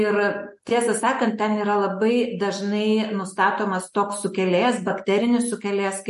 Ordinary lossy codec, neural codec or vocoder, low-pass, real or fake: MP3, 48 kbps; none; 14.4 kHz; real